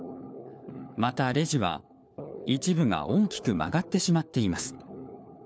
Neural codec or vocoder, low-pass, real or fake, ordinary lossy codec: codec, 16 kHz, 4 kbps, FunCodec, trained on LibriTTS, 50 frames a second; none; fake; none